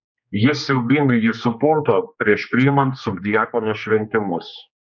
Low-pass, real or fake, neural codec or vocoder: 7.2 kHz; fake; codec, 16 kHz, 2 kbps, X-Codec, HuBERT features, trained on general audio